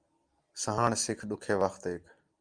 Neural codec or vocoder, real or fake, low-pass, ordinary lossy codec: none; real; 9.9 kHz; Opus, 32 kbps